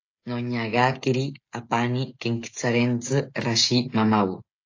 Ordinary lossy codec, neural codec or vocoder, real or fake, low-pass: AAC, 48 kbps; codec, 16 kHz, 16 kbps, FreqCodec, smaller model; fake; 7.2 kHz